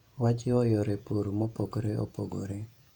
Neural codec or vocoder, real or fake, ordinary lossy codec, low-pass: vocoder, 48 kHz, 128 mel bands, Vocos; fake; none; 19.8 kHz